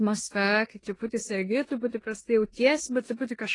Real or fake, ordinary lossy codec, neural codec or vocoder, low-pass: fake; AAC, 32 kbps; codec, 16 kHz in and 24 kHz out, 0.9 kbps, LongCat-Audio-Codec, four codebook decoder; 10.8 kHz